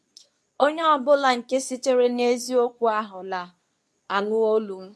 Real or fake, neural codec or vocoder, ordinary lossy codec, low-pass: fake; codec, 24 kHz, 0.9 kbps, WavTokenizer, medium speech release version 2; none; none